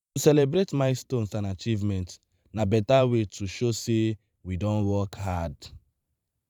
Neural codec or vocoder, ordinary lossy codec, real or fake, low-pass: vocoder, 44.1 kHz, 128 mel bands every 512 samples, BigVGAN v2; none; fake; 19.8 kHz